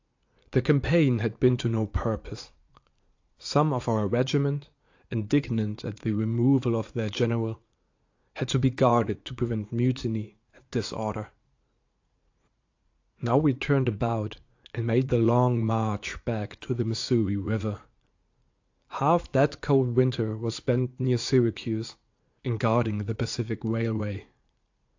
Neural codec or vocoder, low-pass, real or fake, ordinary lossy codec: vocoder, 44.1 kHz, 80 mel bands, Vocos; 7.2 kHz; fake; AAC, 48 kbps